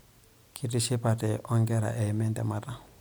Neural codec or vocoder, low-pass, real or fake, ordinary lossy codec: none; none; real; none